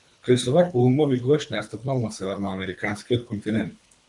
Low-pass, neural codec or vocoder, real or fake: 10.8 kHz; codec, 24 kHz, 3 kbps, HILCodec; fake